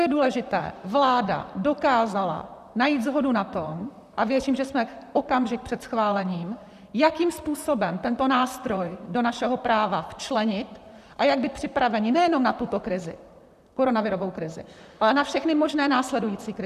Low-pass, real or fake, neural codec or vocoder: 14.4 kHz; fake; vocoder, 44.1 kHz, 128 mel bands, Pupu-Vocoder